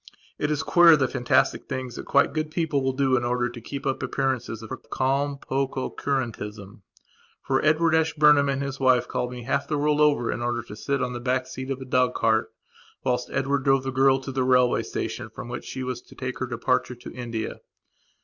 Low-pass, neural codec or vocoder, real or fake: 7.2 kHz; none; real